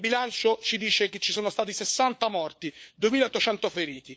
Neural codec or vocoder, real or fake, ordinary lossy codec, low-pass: codec, 16 kHz, 4 kbps, FunCodec, trained on LibriTTS, 50 frames a second; fake; none; none